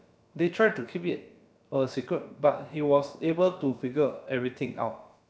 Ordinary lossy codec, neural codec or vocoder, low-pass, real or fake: none; codec, 16 kHz, about 1 kbps, DyCAST, with the encoder's durations; none; fake